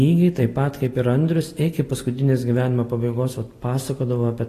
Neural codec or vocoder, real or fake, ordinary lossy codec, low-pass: none; real; AAC, 48 kbps; 14.4 kHz